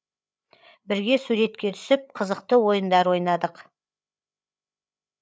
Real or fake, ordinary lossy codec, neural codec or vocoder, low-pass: fake; none; codec, 16 kHz, 8 kbps, FreqCodec, larger model; none